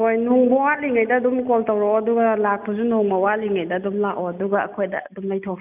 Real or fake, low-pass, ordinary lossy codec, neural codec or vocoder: real; 3.6 kHz; none; none